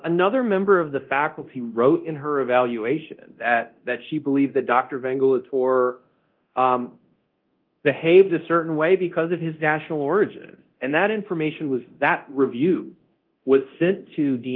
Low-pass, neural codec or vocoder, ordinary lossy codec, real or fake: 5.4 kHz; codec, 24 kHz, 0.9 kbps, DualCodec; Opus, 32 kbps; fake